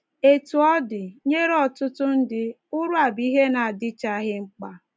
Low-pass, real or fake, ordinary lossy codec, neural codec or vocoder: none; real; none; none